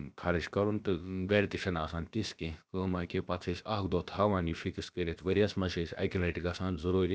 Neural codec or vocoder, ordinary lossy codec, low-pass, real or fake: codec, 16 kHz, about 1 kbps, DyCAST, with the encoder's durations; none; none; fake